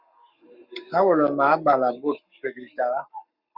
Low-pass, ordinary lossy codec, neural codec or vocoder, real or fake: 5.4 kHz; Opus, 64 kbps; codec, 44.1 kHz, 7.8 kbps, Pupu-Codec; fake